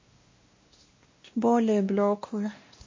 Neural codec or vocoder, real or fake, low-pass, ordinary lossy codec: codec, 16 kHz, 1 kbps, X-Codec, WavLM features, trained on Multilingual LibriSpeech; fake; 7.2 kHz; MP3, 32 kbps